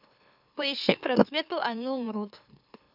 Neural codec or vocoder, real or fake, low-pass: autoencoder, 44.1 kHz, a latent of 192 numbers a frame, MeloTTS; fake; 5.4 kHz